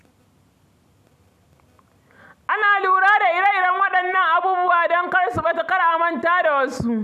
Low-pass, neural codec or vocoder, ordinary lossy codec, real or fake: 14.4 kHz; none; none; real